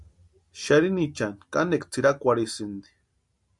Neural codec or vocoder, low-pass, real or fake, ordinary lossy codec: none; 10.8 kHz; real; MP3, 64 kbps